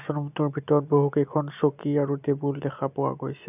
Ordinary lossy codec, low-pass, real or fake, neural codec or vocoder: none; 3.6 kHz; real; none